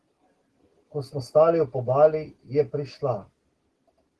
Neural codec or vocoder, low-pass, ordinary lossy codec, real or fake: none; 10.8 kHz; Opus, 16 kbps; real